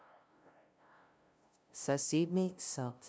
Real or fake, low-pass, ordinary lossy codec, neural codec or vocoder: fake; none; none; codec, 16 kHz, 0.5 kbps, FunCodec, trained on LibriTTS, 25 frames a second